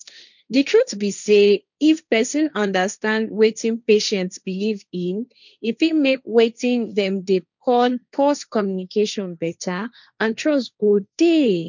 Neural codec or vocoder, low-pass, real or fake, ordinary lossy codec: codec, 16 kHz, 1.1 kbps, Voila-Tokenizer; 7.2 kHz; fake; none